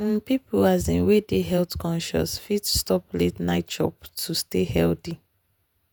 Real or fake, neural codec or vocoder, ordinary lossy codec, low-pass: fake; vocoder, 48 kHz, 128 mel bands, Vocos; none; none